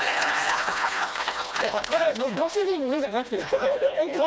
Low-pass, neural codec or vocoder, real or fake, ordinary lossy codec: none; codec, 16 kHz, 1 kbps, FreqCodec, smaller model; fake; none